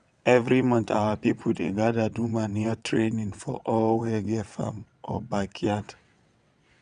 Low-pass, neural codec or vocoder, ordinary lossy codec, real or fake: 9.9 kHz; vocoder, 22.05 kHz, 80 mel bands, WaveNeXt; none; fake